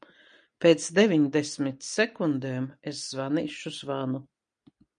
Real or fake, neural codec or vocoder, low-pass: real; none; 9.9 kHz